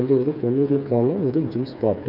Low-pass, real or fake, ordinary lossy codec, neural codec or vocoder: 5.4 kHz; fake; none; codec, 16 kHz, 4 kbps, FreqCodec, smaller model